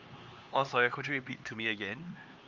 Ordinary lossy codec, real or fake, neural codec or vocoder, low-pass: Opus, 32 kbps; fake; codec, 16 kHz, 4 kbps, X-Codec, HuBERT features, trained on LibriSpeech; 7.2 kHz